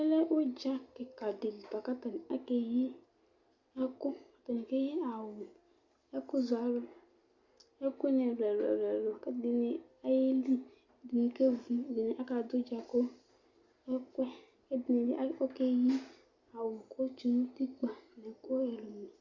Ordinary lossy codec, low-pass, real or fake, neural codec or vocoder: Opus, 64 kbps; 7.2 kHz; real; none